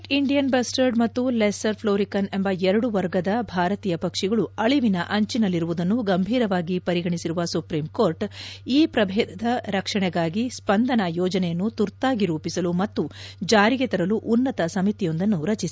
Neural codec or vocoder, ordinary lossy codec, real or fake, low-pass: none; none; real; none